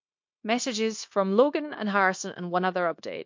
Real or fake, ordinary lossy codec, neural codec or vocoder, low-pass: fake; MP3, 48 kbps; codec, 16 kHz, 0.9 kbps, LongCat-Audio-Codec; 7.2 kHz